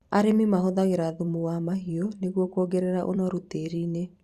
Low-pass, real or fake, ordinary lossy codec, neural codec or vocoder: 14.4 kHz; fake; Opus, 64 kbps; vocoder, 44.1 kHz, 128 mel bands every 256 samples, BigVGAN v2